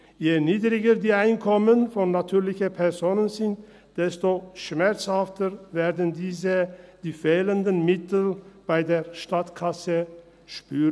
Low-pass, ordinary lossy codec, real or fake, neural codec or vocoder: none; none; real; none